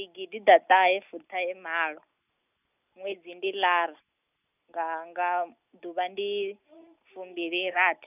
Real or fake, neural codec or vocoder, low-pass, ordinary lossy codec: real; none; 3.6 kHz; none